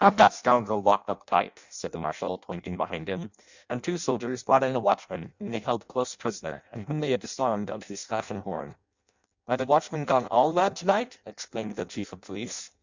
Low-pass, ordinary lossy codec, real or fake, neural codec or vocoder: 7.2 kHz; Opus, 64 kbps; fake; codec, 16 kHz in and 24 kHz out, 0.6 kbps, FireRedTTS-2 codec